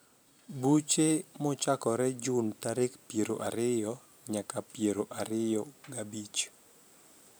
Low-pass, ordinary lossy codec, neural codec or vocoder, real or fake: none; none; vocoder, 44.1 kHz, 128 mel bands every 512 samples, BigVGAN v2; fake